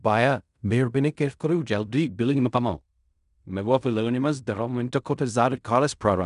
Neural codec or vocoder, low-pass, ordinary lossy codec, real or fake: codec, 16 kHz in and 24 kHz out, 0.4 kbps, LongCat-Audio-Codec, fine tuned four codebook decoder; 10.8 kHz; none; fake